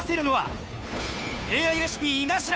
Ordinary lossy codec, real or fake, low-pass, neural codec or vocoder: none; fake; none; codec, 16 kHz, 2 kbps, FunCodec, trained on Chinese and English, 25 frames a second